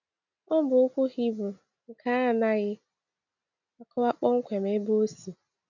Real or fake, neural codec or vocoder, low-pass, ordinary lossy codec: real; none; 7.2 kHz; none